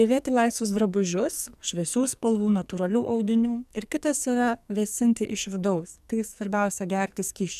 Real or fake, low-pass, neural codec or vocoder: fake; 14.4 kHz; codec, 44.1 kHz, 2.6 kbps, SNAC